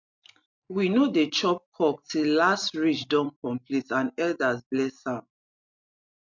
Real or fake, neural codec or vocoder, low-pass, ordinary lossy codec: real; none; 7.2 kHz; MP3, 64 kbps